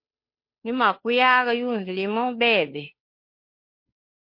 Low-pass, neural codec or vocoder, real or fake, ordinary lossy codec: 5.4 kHz; codec, 16 kHz, 2 kbps, FunCodec, trained on Chinese and English, 25 frames a second; fake; MP3, 32 kbps